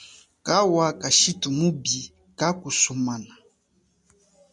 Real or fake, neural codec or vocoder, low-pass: real; none; 10.8 kHz